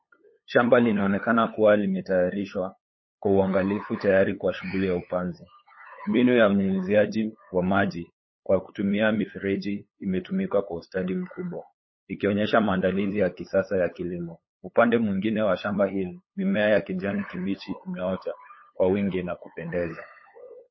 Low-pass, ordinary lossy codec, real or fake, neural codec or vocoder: 7.2 kHz; MP3, 24 kbps; fake; codec, 16 kHz, 8 kbps, FunCodec, trained on LibriTTS, 25 frames a second